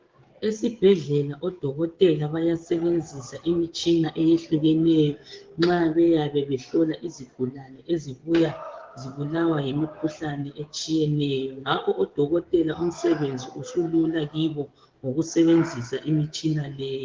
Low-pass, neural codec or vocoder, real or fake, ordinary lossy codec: 7.2 kHz; codec, 16 kHz, 16 kbps, FreqCodec, smaller model; fake; Opus, 16 kbps